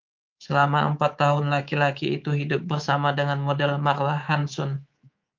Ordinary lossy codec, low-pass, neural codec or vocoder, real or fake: Opus, 24 kbps; 7.2 kHz; codec, 24 kHz, 3.1 kbps, DualCodec; fake